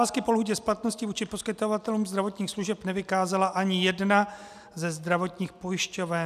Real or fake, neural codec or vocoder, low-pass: real; none; 14.4 kHz